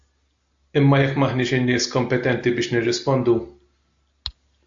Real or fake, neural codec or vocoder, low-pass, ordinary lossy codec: real; none; 7.2 kHz; AAC, 64 kbps